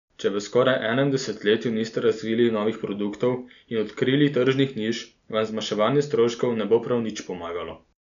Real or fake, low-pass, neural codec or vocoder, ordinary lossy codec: real; 7.2 kHz; none; none